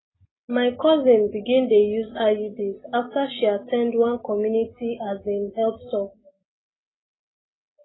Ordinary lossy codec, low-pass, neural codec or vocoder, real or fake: AAC, 16 kbps; 7.2 kHz; none; real